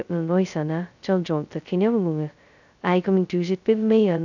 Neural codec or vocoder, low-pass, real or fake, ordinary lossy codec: codec, 16 kHz, 0.2 kbps, FocalCodec; 7.2 kHz; fake; none